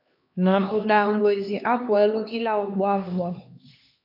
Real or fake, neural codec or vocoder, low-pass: fake; codec, 16 kHz, 2 kbps, X-Codec, HuBERT features, trained on LibriSpeech; 5.4 kHz